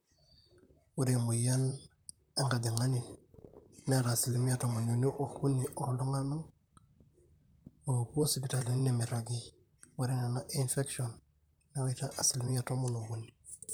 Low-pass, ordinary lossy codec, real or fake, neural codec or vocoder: none; none; fake; vocoder, 44.1 kHz, 128 mel bands, Pupu-Vocoder